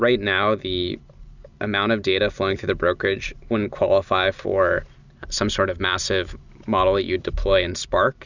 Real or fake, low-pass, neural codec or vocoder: real; 7.2 kHz; none